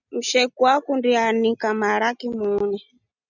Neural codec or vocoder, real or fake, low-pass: none; real; 7.2 kHz